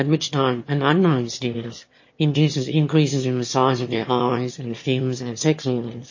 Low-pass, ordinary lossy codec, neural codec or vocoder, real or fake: 7.2 kHz; MP3, 32 kbps; autoencoder, 22.05 kHz, a latent of 192 numbers a frame, VITS, trained on one speaker; fake